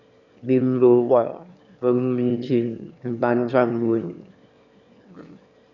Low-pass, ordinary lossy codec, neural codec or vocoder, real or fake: 7.2 kHz; none; autoencoder, 22.05 kHz, a latent of 192 numbers a frame, VITS, trained on one speaker; fake